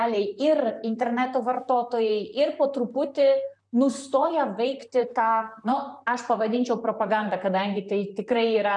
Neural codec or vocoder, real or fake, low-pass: vocoder, 44.1 kHz, 128 mel bands, Pupu-Vocoder; fake; 10.8 kHz